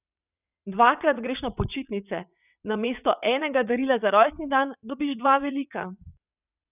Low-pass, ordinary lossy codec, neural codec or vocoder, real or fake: 3.6 kHz; none; vocoder, 22.05 kHz, 80 mel bands, WaveNeXt; fake